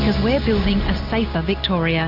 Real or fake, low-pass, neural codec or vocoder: real; 5.4 kHz; none